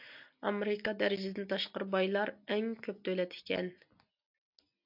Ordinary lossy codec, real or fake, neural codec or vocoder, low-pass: AAC, 48 kbps; real; none; 5.4 kHz